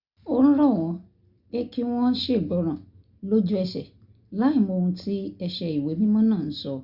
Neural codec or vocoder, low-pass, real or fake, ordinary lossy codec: none; 5.4 kHz; real; Opus, 64 kbps